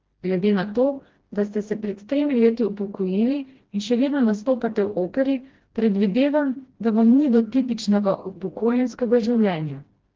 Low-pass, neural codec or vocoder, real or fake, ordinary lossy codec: 7.2 kHz; codec, 16 kHz, 1 kbps, FreqCodec, smaller model; fake; Opus, 16 kbps